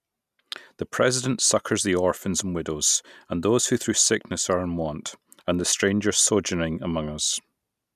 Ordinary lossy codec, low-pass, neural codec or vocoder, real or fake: none; 14.4 kHz; none; real